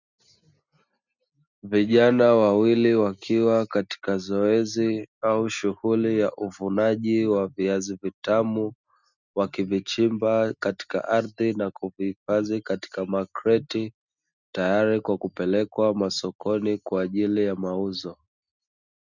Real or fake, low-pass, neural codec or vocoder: real; 7.2 kHz; none